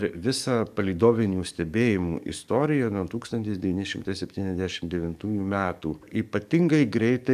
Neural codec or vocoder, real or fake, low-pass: codec, 44.1 kHz, 7.8 kbps, DAC; fake; 14.4 kHz